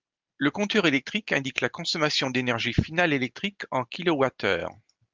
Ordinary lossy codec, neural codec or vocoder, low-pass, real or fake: Opus, 32 kbps; none; 7.2 kHz; real